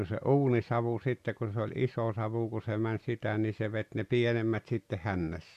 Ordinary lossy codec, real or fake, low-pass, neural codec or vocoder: Opus, 64 kbps; real; 14.4 kHz; none